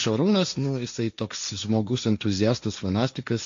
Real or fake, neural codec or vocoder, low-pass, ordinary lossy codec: fake; codec, 16 kHz, 1.1 kbps, Voila-Tokenizer; 7.2 kHz; AAC, 64 kbps